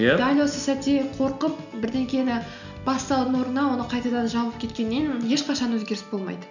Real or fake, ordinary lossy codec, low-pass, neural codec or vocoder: real; none; 7.2 kHz; none